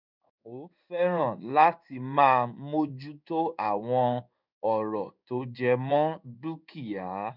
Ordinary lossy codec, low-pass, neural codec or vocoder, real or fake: none; 5.4 kHz; codec, 16 kHz in and 24 kHz out, 1 kbps, XY-Tokenizer; fake